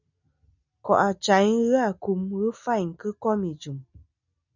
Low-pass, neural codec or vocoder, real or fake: 7.2 kHz; none; real